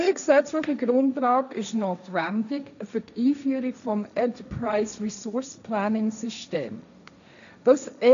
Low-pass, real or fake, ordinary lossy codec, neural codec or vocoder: 7.2 kHz; fake; none; codec, 16 kHz, 1.1 kbps, Voila-Tokenizer